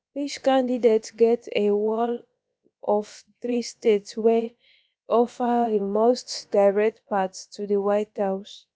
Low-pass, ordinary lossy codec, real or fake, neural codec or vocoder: none; none; fake; codec, 16 kHz, about 1 kbps, DyCAST, with the encoder's durations